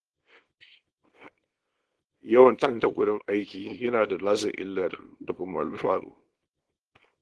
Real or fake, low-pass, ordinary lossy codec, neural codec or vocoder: fake; 10.8 kHz; Opus, 16 kbps; codec, 24 kHz, 0.9 kbps, WavTokenizer, small release